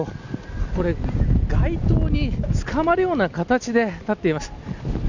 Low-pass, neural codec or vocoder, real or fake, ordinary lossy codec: 7.2 kHz; none; real; none